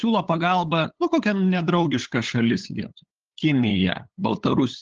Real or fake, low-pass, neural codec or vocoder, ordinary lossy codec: fake; 7.2 kHz; codec, 16 kHz, 8 kbps, FunCodec, trained on LibriTTS, 25 frames a second; Opus, 16 kbps